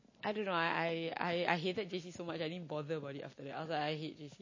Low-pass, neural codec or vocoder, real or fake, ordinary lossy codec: 7.2 kHz; none; real; MP3, 32 kbps